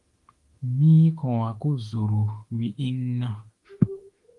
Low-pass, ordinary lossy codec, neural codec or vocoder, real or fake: 10.8 kHz; Opus, 24 kbps; autoencoder, 48 kHz, 32 numbers a frame, DAC-VAE, trained on Japanese speech; fake